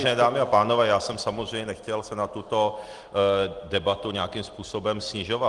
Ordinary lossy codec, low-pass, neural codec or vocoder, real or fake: Opus, 24 kbps; 10.8 kHz; none; real